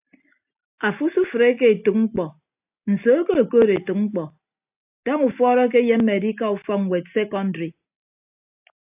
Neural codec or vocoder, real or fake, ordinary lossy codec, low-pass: none; real; AAC, 32 kbps; 3.6 kHz